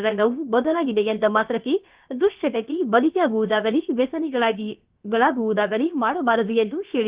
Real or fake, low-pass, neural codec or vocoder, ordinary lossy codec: fake; 3.6 kHz; codec, 16 kHz, about 1 kbps, DyCAST, with the encoder's durations; Opus, 32 kbps